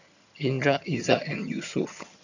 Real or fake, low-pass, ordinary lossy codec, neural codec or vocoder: fake; 7.2 kHz; none; vocoder, 22.05 kHz, 80 mel bands, HiFi-GAN